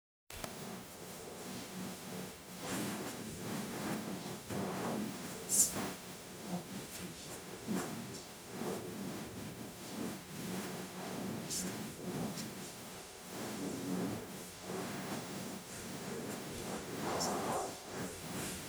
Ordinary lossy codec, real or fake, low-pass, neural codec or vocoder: none; fake; none; codec, 44.1 kHz, 0.9 kbps, DAC